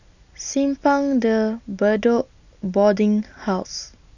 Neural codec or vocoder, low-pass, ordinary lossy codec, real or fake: none; 7.2 kHz; none; real